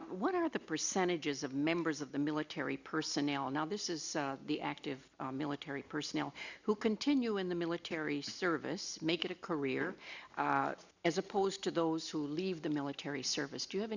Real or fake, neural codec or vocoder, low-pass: real; none; 7.2 kHz